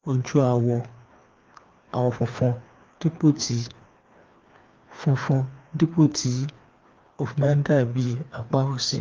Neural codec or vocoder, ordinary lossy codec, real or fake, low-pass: codec, 16 kHz, 2 kbps, FreqCodec, larger model; Opus, 24 kbps; fake; 7.2 kHz